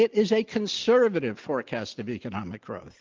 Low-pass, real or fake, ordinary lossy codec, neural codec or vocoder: 7.2 kHz; real; Opus, 32 kbps; none